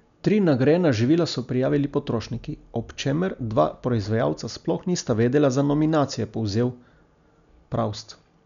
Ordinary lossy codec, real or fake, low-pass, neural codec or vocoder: none; real; 7.2 kHz; none